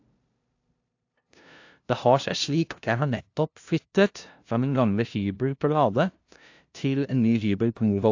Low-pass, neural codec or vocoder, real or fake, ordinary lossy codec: 7.2 kHz; codec, 16 kHz, 0.5 kbps, FunCodec, trained on LibriTTS, 25 frames a second; fake; AAC, 48 kbps